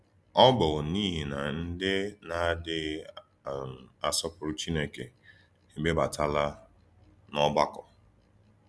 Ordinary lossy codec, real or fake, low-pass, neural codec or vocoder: none; real; none; none